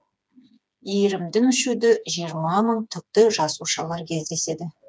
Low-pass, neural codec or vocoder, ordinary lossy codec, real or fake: none; codec, 16 kHz, 4 kbps, FreqCodec, smaller model; none; fake